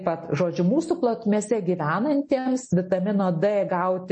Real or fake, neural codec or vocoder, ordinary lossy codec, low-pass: real; none; MP3, 32 kbps; 10.8 kHz